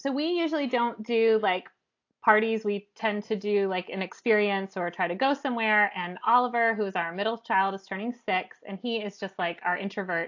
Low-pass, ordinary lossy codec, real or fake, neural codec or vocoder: 7.2 kHz; AAC, 48 kbps; real; none